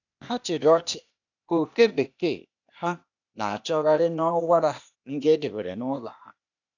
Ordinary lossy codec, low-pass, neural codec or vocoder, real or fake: none; 7.2 kHz; codec, 16 kHz, 0.8 kbps, ZipCodec; fake